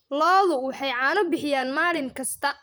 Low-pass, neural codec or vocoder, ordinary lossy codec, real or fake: none; vocoder, 44.1 kHz, 128 mel bands, Pupu-Vocoder; none; fake